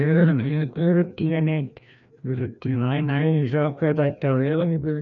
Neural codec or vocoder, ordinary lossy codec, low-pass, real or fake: codec, 16 kHz, 1 kbps, FreqCodec, larger model; none; 7.2 kHz; fake